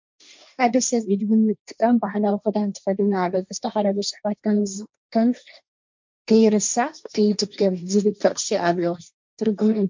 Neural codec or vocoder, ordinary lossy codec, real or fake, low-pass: codec, 16 kHz, 1.1 kbps, Voila-Tokenizer; MP3, 64 kbps; fake; 7.2 kHz